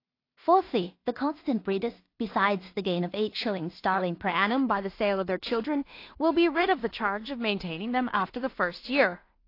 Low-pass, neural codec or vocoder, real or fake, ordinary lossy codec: 5.4 kHz; codec, 16 kHz in and 24 kHz out, 0.4 kbps, LongCat-Audio-Codec, two codebook decoder; fake; AAC, 32 kbps